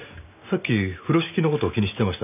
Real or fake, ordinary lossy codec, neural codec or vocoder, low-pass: real; none; none; 3.6 kHz